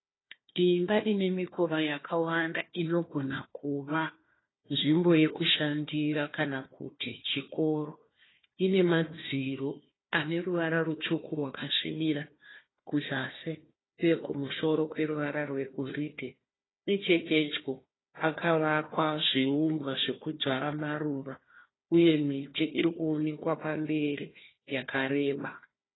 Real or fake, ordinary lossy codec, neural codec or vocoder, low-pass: fake; AAC, 16 kbps; codec, 16 kHz, 1 kbps, FunCodec, trained on Chinese and English, 50 frames a second; 7.2 kHz